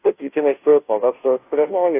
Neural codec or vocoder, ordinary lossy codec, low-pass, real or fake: codec, 16 kHz, 0.5 kbps, FunCodec, trained on Chinese and English, 25 frames a second; AAC, 24 kbps; 3.6 kHz; fake